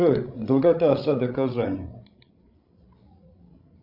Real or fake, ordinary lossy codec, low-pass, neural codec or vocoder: fake; MP3, 48 kbps; 5.4 kHz; codec, 16 kHz, 16 kbps, FreqCodec, larger model